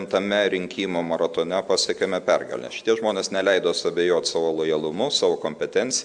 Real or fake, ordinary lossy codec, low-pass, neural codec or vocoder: real; MP3, 96 kbps; 9.9 kHz; none